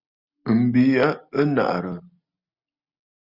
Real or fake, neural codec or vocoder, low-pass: real; none; 5.4 kHz